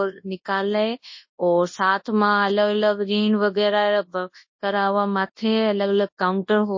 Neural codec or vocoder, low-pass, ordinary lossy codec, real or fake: codec, 24 kHz, 0.9 kbps, WavTokenizer, large speech release; 7.2 kHz; MP3, 32 kbps; fake